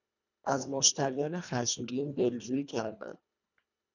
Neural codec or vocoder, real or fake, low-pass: codec, 24 kHz, 1.5 kbps, HILCodec; fake; 7.2 kHz